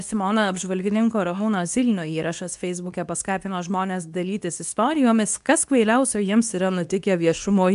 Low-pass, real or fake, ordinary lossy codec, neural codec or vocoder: 10.8 kHz; fake; AAC, 96 kbps; codec, 24 kHz, 0.9 kbps, WavTokenizer, medium speech release version 2